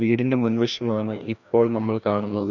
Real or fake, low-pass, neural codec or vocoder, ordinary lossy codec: fake; 7.2 kHz; codec, 16 kHz, 1 kbps, FreqCodec, larger model; none